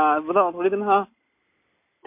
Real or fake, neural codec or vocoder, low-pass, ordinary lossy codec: real; none; 3.6 kHz; MP3, 24 kbps